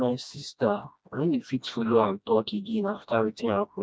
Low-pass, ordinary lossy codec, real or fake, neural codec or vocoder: none; none; fake; codec, 16 kHz, 1 kbps, FreqCodec, smaller model